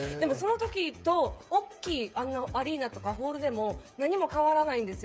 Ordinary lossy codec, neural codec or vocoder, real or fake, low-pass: none; codec, 16 kHz, 8 kbps, FreqCodec, smaller model; fake; none